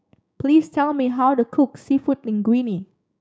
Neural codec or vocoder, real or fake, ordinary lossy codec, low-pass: codec, 16 kHz, 6 kbps, DAC; fake; none; none